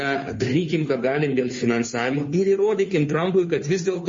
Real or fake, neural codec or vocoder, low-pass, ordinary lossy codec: fake; codec, 16 kHz, 2 kbps, FunCodec, trained on Chinese and English, 25 frames a second; 7.2 kHz; MP3, 32 kbps